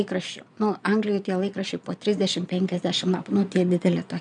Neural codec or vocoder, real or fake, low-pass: vocoder, 22.05 kHz, 80 mel bands, Vocos; fake; 9.9 kHz